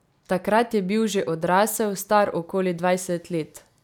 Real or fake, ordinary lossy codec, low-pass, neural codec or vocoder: real; none; 19.8 kHz; none